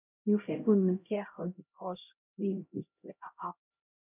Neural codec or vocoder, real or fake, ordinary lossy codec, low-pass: codec, 16 kHz, 0.5 kbps, X-Codec, HuBERT features, trained on LibriSpeech; fake; none; 3.6 kHz